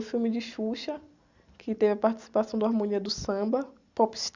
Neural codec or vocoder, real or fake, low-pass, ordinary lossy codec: none; real; 7.2 kHz; Opus, 64 kbps